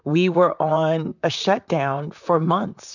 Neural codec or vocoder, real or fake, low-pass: vocoder, 44.1 kHz, 128 mel bands, Pupu-Vocoder; fake; 7.2 kHz